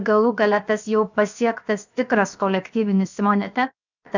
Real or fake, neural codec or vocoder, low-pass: fake; codec, 16 kHz, 0.7 kbps, FocalCodec; 7.2 kHz